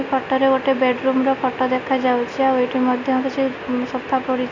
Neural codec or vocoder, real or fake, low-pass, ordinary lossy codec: none; real; 7.2 kHz; none